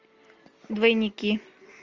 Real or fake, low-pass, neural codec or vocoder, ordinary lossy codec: real; 7.2 kHz; none; Opus, 32 kbps